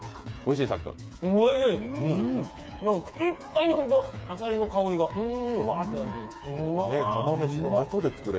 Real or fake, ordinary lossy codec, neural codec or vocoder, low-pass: fake; none; codec, 16 kHz, 4 kbps, FreqCodec, smaller model; none